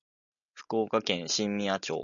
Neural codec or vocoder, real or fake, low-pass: none; real; 7.2 kHz